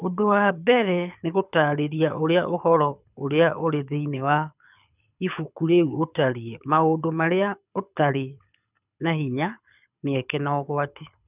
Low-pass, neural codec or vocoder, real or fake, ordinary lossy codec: 3.6 kHz; codec, 24 kHz, 6 kbps, HILCodec; fake; none